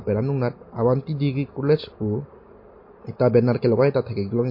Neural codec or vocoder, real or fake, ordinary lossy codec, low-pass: none; real; MP3, 32 kbps; 5.4 kHz